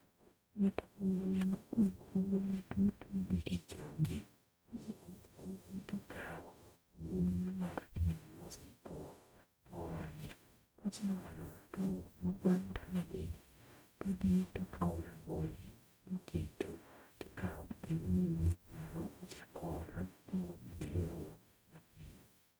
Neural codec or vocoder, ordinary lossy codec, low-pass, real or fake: codec, 44.1 kHz, 0.9 kbps, DAC; none; none; fake